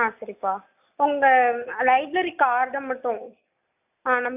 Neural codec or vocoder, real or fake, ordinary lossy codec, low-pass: none; real; AAC, 32 kbps; 3.6 kHz